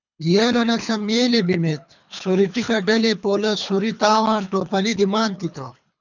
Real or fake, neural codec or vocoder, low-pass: fake; codec, 24 kHz, 3 kbps, HILCodec; 7.2 kHz